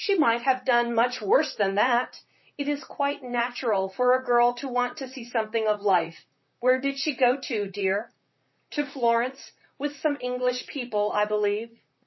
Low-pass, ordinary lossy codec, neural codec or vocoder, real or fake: 7.2 kHz; MP3, 24 kbps; vocoder, 44.1 kHz, 128 mel bands, Pupu-Vocoder; fake